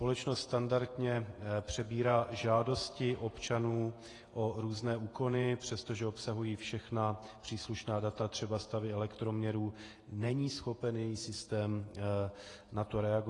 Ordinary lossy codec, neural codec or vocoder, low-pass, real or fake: AAC, 32 kbps; vocoder, 48 kHz, 128 mel bands, Vocos; 10.8 kHz; fake